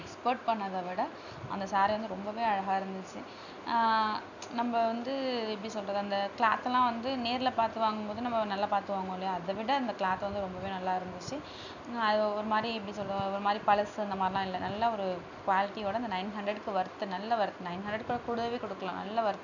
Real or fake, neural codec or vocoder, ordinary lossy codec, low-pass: real; none; none; 7.2 kHz